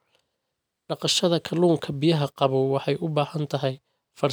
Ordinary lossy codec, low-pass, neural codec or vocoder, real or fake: none; none; none; real